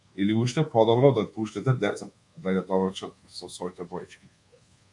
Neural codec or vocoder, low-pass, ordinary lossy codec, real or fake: codec, 24 kHz, 1.2 kbps, DualCodec; 10.8 kHz; MP3, 64 kbps; fake